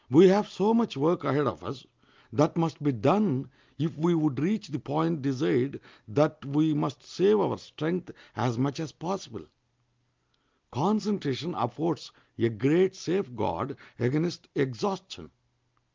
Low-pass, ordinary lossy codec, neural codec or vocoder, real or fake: 7.2 kHz; Opus, 32 kbps; none; real